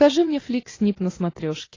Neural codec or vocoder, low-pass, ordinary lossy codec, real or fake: none; 7.2 kHz; AAC, 32 kbps; real